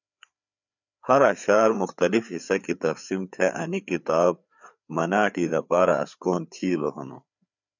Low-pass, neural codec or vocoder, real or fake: 7.2 kHz; codec, 16 kHz, 4 kbps, FreqCodec, larger model; fake